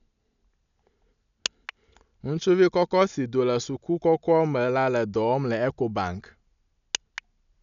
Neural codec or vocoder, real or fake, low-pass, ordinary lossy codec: none; real; 7.2 kHz; none